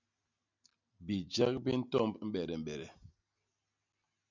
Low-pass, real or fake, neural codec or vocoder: 7.2 kHz; real; none